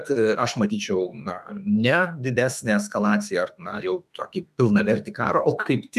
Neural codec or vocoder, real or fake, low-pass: autoencoder, 48 kHz, 32 numbers a frame, DAC-VAE, trained on Japanese speech; fake; 14.4 kHz